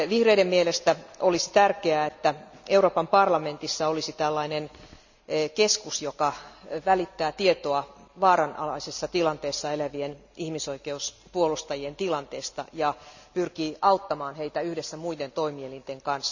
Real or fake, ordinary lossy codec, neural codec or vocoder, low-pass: real; none; none; 7.2 kHz